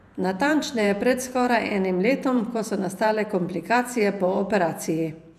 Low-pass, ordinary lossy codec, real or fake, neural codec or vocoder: 14.4 kHz; none; fake; vocoder, 48 kHz, 128 mel bands, Vocos